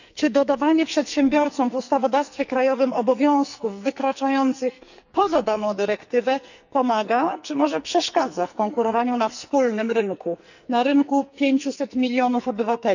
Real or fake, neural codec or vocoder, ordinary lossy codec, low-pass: fake; codec, 44.1 kHz, 2.6 kbps, SNAC; none; 7.2 kHz